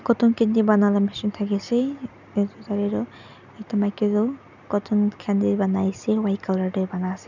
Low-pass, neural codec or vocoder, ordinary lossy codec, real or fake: 7.2 kHz; none; none; real